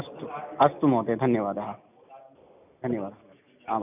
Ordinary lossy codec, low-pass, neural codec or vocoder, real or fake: none; 3.6 kHz; none; real